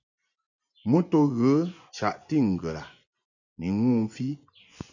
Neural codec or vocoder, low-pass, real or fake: vocoder, 24 kHz, 100 mel bands, Vocos; 7.2 kHz; fake